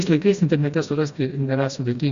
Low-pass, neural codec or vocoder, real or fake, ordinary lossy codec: 7.2 kHz; codec, 16 kHz, 1 kbps, FreqCodec, smaller model; fake; Opus, 64 kbps